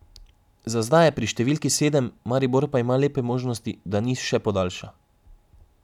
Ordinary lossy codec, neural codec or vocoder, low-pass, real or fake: none; none; 19.8 kHz; real